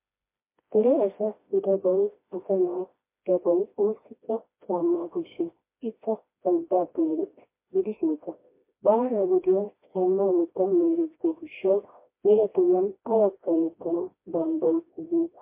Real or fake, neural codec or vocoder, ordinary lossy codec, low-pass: fake; codec, 16 kHz, 1 kbps, FreqCodec, smaller model; MP3, 24 kbps; 3.6 kHz